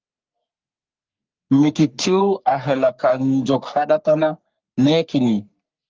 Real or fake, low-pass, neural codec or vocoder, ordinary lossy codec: fake; 7.2 kHz; codec, 44.1 kHz, 3.4 kbps, Pupu-Codec; Opus, 32 kbps